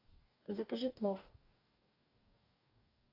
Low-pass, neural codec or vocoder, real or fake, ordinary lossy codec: 5.4 kHz; codec, 44.1 kHz, 2.6 kbps, DAC; fake; AAC, 32 kbps